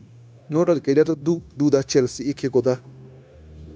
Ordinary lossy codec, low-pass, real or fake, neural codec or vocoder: none; none; fake; codec, 16 kHz, 0.9 kbps, LongCat-Audio-Codec